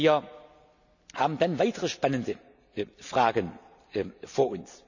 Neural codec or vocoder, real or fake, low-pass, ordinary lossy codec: none; real; 7.2 kHz; none